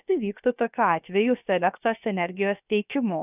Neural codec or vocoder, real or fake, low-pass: codec, 16 kHz, 0.7 kbps, FocalCodec; fake; 3.6 kHz